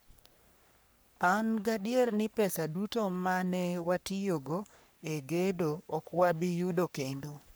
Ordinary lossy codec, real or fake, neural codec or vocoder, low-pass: none; fake; codec, 44.1 kHz, 3.4 kbps, Pupu-Codec; none